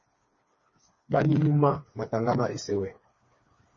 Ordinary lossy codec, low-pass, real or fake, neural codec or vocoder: MP3, 32 kbps; 7.2 kHz; fake; codec, 16 kHz, 4 kbps, FreqCodec, smaller model